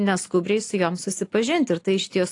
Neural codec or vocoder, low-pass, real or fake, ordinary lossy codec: none; 10.8 kHz; real; AAC, 48 kbps